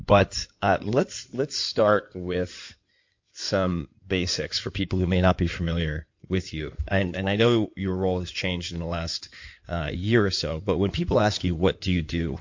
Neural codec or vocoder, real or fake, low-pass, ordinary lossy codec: codec, 16 kHz in and 24 kHz out, 2.2 kbps, FireRedTTS-2 codec; fake; 7.2 kHz; MP3, 48 kbps